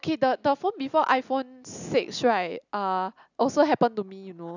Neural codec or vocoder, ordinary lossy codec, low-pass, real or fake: none; none; 7.2 kHz; real